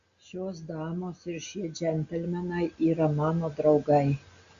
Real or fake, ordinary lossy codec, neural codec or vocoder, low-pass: real; Opus, 64 kbps; none; 7.2 kHz